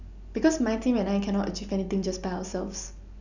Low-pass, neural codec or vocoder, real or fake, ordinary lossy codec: 7.2 kHz; none; real; none